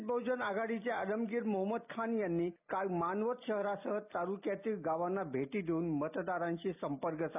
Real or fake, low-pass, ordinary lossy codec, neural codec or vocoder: real; 3.6 kHz; none; none